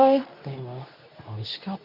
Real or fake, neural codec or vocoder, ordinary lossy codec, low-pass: fake; codec, 24 kHz, 0.9 kbps, WavTokenizer, medium speech release version 2; none; 5.4 kHz